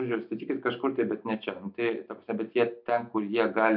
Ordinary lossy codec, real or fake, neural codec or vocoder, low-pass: MP3, 48 kbps; real; none; 5.4 kHz